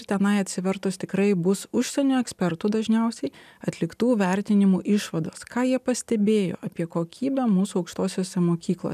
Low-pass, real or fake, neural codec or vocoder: 14.4 kHz; real; none